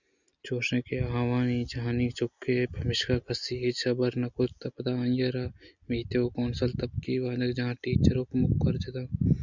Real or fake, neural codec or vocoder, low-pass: real; none; 7.2 kHz